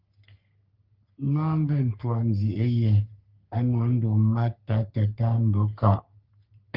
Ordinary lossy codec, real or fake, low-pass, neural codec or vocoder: Opus, 24 kbps; fake; 5.4 kHz; codec, 44.1 kHz, 3.4 kbps, Pupu-Codec